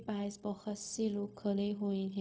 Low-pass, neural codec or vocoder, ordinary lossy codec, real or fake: none; codec, 16 kHz, 0.4 kbps, LongCat-Audio-Codec; none; fake